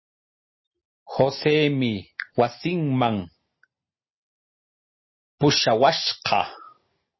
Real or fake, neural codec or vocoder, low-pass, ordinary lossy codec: real; none; 7.2 kHz; MP3, 24 kbps